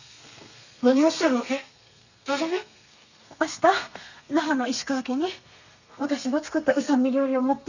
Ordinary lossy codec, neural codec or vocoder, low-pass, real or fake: none; codec, 32 kHz, 1.9 kbps, SNAC; 7.2 kHz; fake